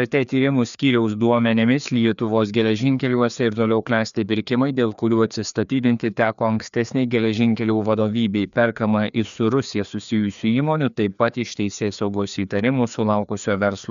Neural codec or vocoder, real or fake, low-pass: codec, 16 kHz, 2 kbps, FreqCodec, larger model; fake; 7.2 kHz